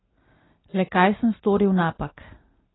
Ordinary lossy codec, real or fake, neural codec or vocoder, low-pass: AAC, 16 kbps; real; none; 7.2 kHz